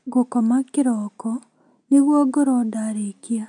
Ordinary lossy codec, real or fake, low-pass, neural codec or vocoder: none; real; 9.9 kHz; none